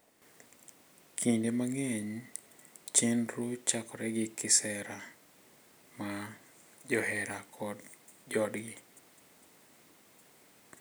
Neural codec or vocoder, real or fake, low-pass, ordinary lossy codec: none; real; none; none